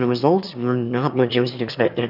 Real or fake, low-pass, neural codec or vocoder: fake; 5.4 kHz; autoencoder, 22.05 kHz, a latent of 192 numbers a frame, VITS, trained on one speaker